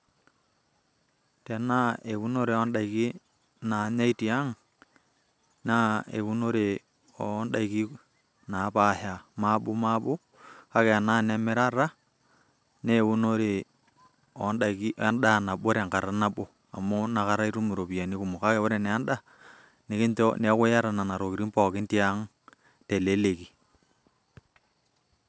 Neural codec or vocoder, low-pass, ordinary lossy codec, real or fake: none; none; none; real